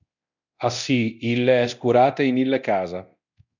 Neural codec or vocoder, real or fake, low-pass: codec, 24 kHz, 0.9 kbps, DualCodec; fake; 7.2 kHz